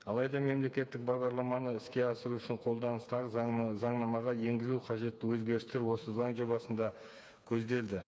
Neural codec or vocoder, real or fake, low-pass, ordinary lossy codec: codec, 16 kHz, 4 kbps, FreqCodec, smaller model; fake; none; none